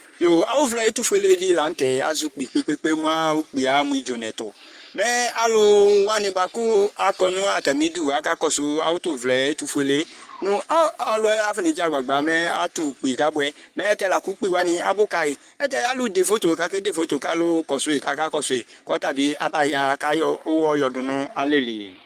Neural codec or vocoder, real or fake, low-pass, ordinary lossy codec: codec, 44.1 kHz, 3.4 kbps, Pupu-Codec; fake; 14.4 kHz; Opus, 32 kbps